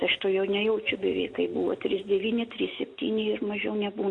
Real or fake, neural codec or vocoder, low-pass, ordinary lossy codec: real; none; 9.9 kHz; AAC, 48 kbps